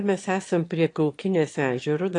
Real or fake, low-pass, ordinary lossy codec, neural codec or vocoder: fake; 9.9 kHz; AAC, 48 kbps; autoencoder, 22.05 kHz, a latent of 192 numbers a frame, VITS, trained on one speaker